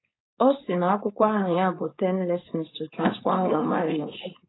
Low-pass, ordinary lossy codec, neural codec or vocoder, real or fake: 7.2 kHz; AAC, 16 kbps; codec, 16 kHz, 4.8 kbps, FACodec; fake